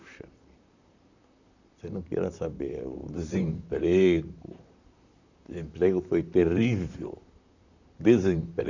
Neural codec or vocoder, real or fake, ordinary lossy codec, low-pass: vocoder, 44.1 kHz, 128 mel bands, Pupu-Vocoder; fake; none; 7.2 kHz